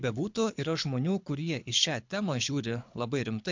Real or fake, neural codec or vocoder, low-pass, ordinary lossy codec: fake; codec, 24 kHz, 6 kbps, HILCodec; 7.2 kHz; MP3, 64 kbps